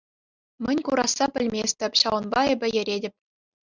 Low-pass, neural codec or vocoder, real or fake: 7.2 kHz; none; real